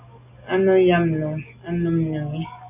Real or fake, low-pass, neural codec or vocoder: real; 3.6 kHz; none